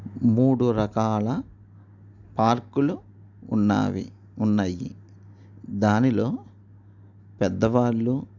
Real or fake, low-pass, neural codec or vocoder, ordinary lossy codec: real; 7.2 kHz; none; none